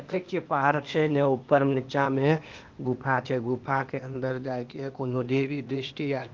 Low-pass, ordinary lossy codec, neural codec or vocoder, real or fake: 7.2 kHz; Opus, 32 kbps; codec, 16 kHz, 0.8 kbps, ZipCodec; fake